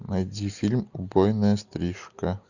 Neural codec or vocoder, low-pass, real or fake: none; 7.2 kHz; real